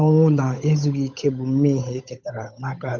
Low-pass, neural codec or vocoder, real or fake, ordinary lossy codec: 7.2 kHz; codec, 16 kHz, 8 kbps, FunCodec, trained on Chinese and English, 25 frames a second; fake; none